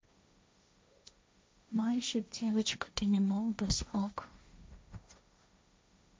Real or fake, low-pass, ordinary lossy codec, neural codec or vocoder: fake; none; none; codec, 16 kHz, 1.1 kbps, Voila-Tokenizer